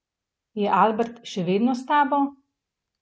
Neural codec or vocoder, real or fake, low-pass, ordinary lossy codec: none; real; none; none